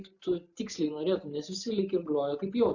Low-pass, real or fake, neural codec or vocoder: 7.2 kHz; real; none